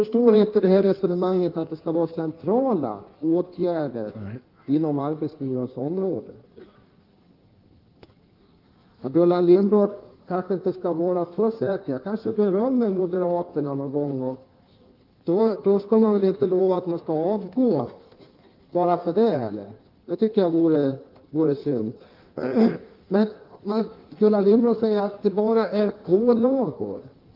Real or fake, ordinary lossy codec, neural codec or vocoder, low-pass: fake; Opus, 24 kbps; codec, 16 kHz in and 24 kHz out, 1.1 kbps, FireRedTTS-2 codec; 5.4 kHz